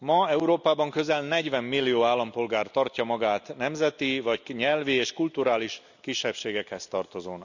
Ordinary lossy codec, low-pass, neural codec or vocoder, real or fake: none; 7.2 kHz; none; real